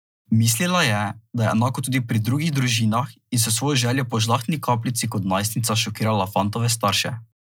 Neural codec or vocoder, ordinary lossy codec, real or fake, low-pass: none; none; real; none